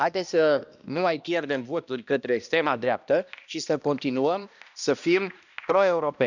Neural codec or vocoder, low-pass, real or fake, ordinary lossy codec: codec, 16 kHz, 1 kbps, X-Codec, HuBERT features, trained on balanced general audio; 7.2 kHz; fake; none